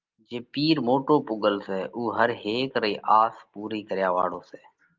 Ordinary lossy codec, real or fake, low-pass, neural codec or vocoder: Opus, 32 kbps; real; 7.2 kHz; none